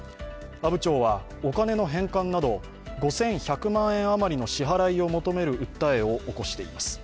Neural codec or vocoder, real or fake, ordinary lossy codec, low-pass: none; real; none; none